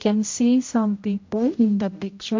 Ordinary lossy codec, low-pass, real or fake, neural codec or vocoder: MP3, 48 kbps; 7.2 kHz; fake; codec, 16 kHz, 0.5 kbps, X-Codec, HuBERT features, trained on general audio